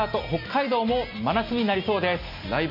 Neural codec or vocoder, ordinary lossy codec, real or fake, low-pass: none; MP3, 48 kbps; real; 5.4 kHz